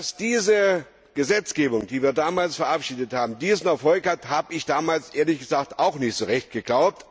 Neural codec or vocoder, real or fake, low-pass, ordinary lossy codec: none; real; none; none